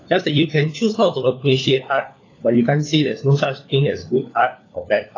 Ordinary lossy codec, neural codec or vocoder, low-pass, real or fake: AAC, 32 kbps; codec, 16 kHz, 4 kbps, FunCodec, trained on LibriTTS, 50 frames a second; 7.2 kHz; fake